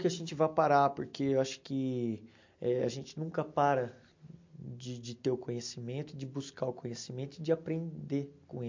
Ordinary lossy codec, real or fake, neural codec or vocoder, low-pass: MP3, 48 kbps; real; none; 7.2 kHz